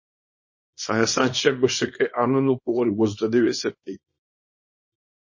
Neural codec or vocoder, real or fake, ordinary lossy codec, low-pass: codec, 24 kHz, 0.9 kbps, WavTokenizer, small release; fake; MP3, 32 kbps; 7.2 kHz